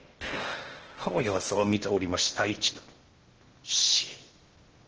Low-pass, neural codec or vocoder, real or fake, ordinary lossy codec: 7.2 kHz; codec, 16 kHz in and 24 kHz out, 0.6 kbps, FocalCodec, streaming, 4096 codes; fake; Opus, 16 kbps